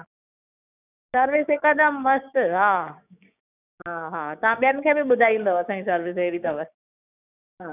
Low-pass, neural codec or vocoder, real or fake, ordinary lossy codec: 3.6 kHz; codec, 16 kHz, 6 kbps, DAC; fake; none